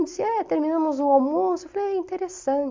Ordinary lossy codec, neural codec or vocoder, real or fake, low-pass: MP3, 64 kbps; none; real; 7.2 kHz